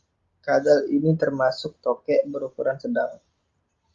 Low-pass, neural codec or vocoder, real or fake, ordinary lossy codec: 7.2 kHz; none; real; Opus, 32 kbps